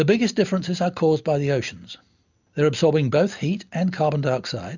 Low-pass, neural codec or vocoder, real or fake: 7.2 kHz; none; real